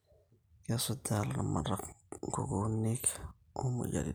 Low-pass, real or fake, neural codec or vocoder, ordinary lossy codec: none; real; none; none